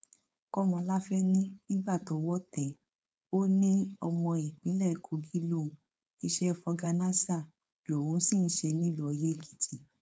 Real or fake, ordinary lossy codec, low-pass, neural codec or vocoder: fake; none; none; codec, 16 kHz, 4.8 kbps, FACodec